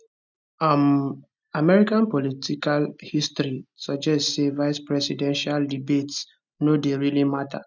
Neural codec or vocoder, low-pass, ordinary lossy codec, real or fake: none; 7.2 kHz; none; real